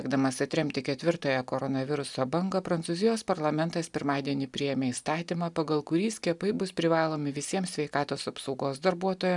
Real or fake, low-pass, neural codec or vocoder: real; 10.8 kHz; none